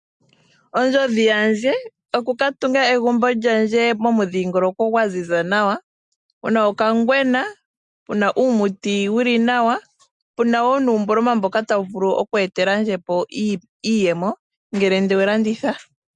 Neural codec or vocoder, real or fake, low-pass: none; real; 10.8 kHz